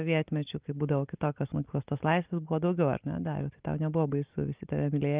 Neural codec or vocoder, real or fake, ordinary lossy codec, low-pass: none; real; Opus, 32 kbps; 3.6 kHz